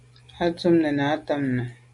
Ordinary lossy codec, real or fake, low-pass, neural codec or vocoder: MP3, 64 kbps; real; 10.8 kHz; none